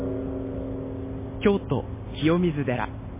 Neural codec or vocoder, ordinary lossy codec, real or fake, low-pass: none; MP3, 16 kbps; real; 3.6 kHz